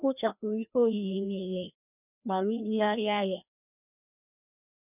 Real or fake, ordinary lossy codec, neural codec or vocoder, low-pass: fake; none; codec, 16 kHz, 1 kbps, FreqCodec, larger model; 3.6 kHz